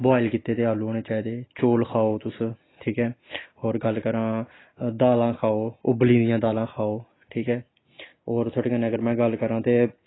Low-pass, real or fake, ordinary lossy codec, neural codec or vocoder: 7.2 kHz; real; AAC, 16 kbps; none